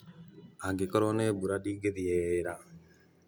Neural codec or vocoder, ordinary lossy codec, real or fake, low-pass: none; none; real; none